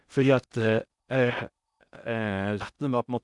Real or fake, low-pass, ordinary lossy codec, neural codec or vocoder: fake; 10.8 kHz; none; codec, 16 kHz in and 24 kHz out, 0.6 kbps, FocalCodec, streaming, 2048 codes